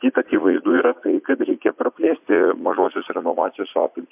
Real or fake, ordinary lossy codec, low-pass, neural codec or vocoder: fake; MP3, 32 kbps; 3.6 kHz; vocoder, 44.1 kHz, 80 mel bands, Vocos